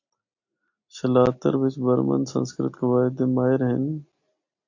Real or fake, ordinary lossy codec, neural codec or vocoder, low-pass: real; AAC, 48 kbps; none; 7.2 kHz